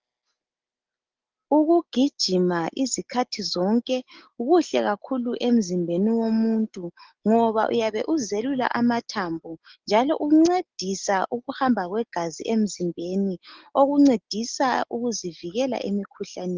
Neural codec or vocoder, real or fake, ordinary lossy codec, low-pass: none; real; Opus, 16 kbps; 7.2 kHz